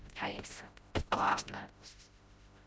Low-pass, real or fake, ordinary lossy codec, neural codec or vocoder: none; fake; none; codec, 16 kHz, 0.5 kbps, FreqCodec, smaller model